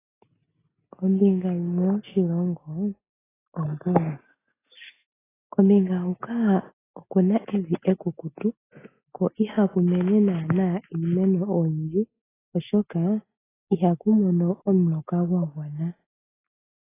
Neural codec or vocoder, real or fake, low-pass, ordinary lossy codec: none; real; 3.6 kHz; AAC, 16 kbps